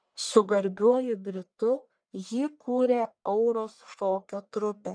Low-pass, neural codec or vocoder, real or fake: 9.9 kHz; codec, 44.1 kHz, 1.7 kbps, Pupu-Codec; fake